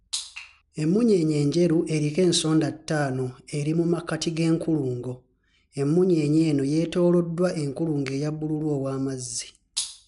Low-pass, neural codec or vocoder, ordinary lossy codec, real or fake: 10.8 kHz; none; none; real